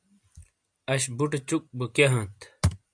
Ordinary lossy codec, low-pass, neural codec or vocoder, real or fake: AAC, 64 kbps; 9.9 kHz; none; real